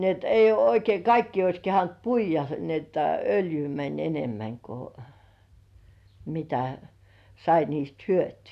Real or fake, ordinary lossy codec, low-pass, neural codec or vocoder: real; AAC, 96 kbps; 14.4 kHz; none